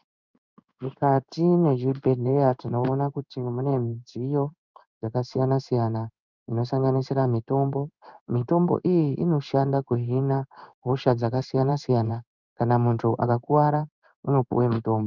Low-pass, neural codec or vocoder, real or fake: 7.2 kHz; codec, 16 kHz in and 24 kHz out, 1 kbps, XY-Tokenizer; fake